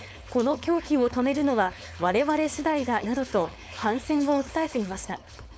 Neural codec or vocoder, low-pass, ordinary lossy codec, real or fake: codec, 16 kHz, 4.8 kbps, FACodec; none; none; fake